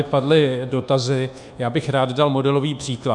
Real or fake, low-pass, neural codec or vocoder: fake; 10.8 kHz; codec, 24 kHz, 1.2 kbps, DualCodec